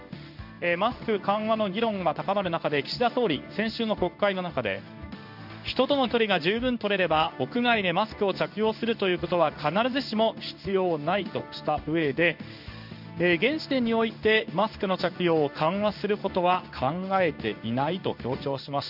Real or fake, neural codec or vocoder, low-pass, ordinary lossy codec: fake; codec, 16 kHz in and 24 kHz out, 1 kbps, XY-Tokenizer; 5.4 kHz; none